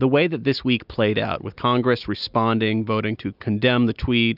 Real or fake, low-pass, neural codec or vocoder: real; 5.4 kHz; none